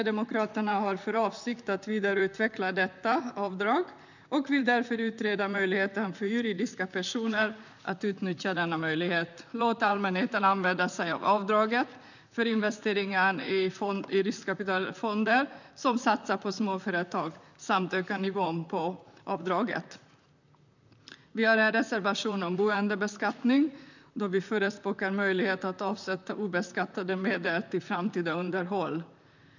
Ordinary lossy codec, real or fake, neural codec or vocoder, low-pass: none; fake; vocoder, 44.1 kHz, 128 mel bands, Pupu-Vocoder; 7.2 kHz